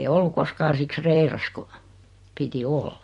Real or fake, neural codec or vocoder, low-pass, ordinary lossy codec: fake; vocoder, 44.1 kHz, 128 mel bands every 256 samples, BigVGAN v2; 14.4 kHz; MP3, 48 kbps